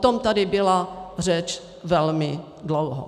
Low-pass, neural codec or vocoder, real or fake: 14.4 kHz; none; real